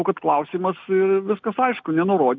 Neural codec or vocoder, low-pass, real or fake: none; 7.2 kHz; real